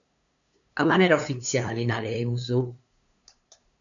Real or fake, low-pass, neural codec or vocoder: fake; 7.2 kHz; codec, 16 kHz, 2 kbps, FunCodec, trained on LibriTTS, 25 frames a second